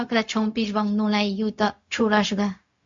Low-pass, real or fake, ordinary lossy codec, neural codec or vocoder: 7.2 kHz; fake; MP3, 48 kbps; codec, 16 kHz, 0.4 kbps, LongCat-Audio-Codec